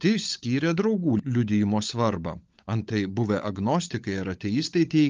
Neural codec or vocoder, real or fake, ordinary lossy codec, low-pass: codec, 16 kHz, 16 kbps, FunCodec, trained on LibriTTS, 50 frames a second; fake; Opus, 32 kbps; 7.2 kHz